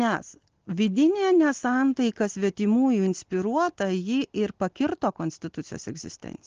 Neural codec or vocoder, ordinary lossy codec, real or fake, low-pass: none; Opus, 16 kbps; real; 7.2 kHz